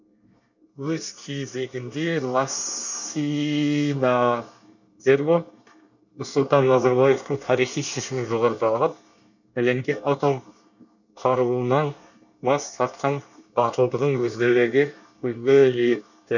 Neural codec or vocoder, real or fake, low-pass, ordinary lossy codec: codec, 24 kHz, 1 kbps, SNAC; fake; 7.2 kHz; none